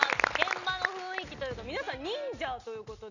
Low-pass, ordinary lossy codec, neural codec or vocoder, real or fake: 7.2 kHz; none; none; real